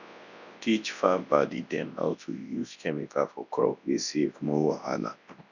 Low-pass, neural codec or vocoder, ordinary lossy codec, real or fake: 7.2 kHz; codec, 24 kHz, 0.9 kbps, WavTokenizer, large speech release; none; fake